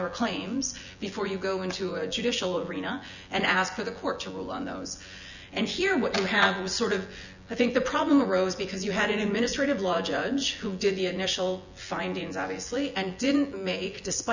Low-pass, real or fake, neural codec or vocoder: 7.2 kHz; fake; vocoder, 24 kHz, 100 mel bands, Vocos